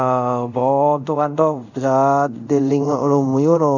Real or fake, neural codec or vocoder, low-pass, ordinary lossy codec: fake; codec, 24 kHz, 0.5 kbps, DualCodec; 7.2 kHz; none